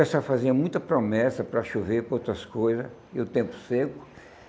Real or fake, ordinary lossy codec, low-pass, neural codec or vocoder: real; none; none; none